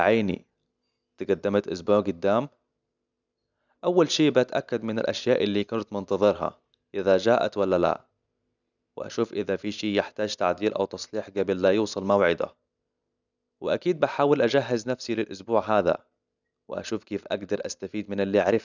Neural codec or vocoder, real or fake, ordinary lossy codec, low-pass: none; real; none; 7.2 kHz